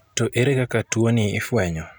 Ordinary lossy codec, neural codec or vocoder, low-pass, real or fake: none; none; none; real